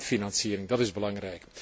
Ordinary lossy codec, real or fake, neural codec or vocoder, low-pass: none; real; none; none